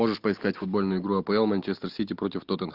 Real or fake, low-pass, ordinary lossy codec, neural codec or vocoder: real; 5.4 kHz; Opus, 32 kbps; none